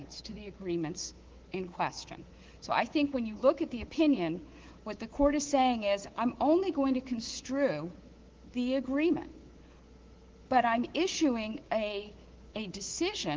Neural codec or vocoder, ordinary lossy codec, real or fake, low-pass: codec, 24 kHz, 3.1 kbps, DualCodec; Opus, 16 kbps; fake; 7.2 kHz